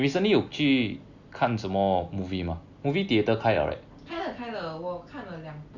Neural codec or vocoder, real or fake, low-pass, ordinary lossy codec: none; real; 7.2 kHz; Opus, 64 kbps